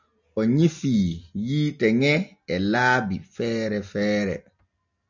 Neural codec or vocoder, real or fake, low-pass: none; real; 7.2 kHz